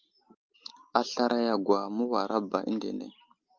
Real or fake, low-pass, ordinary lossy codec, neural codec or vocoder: real; 7.2 kHz; Opus, 32 kbps; none